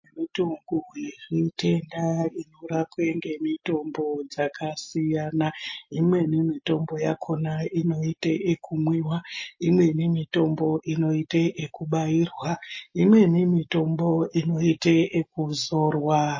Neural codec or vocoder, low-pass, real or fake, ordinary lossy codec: none; 7.2 kHz; real; MP3, 32 kbps